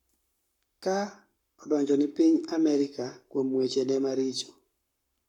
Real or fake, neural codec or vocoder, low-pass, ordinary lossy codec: fake; codec, 44.1 kHz, 7.8 kbps, Pupu-Codec; 19.8 kHz; none